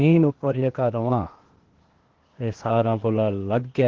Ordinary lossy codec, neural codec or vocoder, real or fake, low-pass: Opus, 16 kbps; codec, 16 kHz, 0.8 kbps, ZipCodec; fake; 7.2 kHz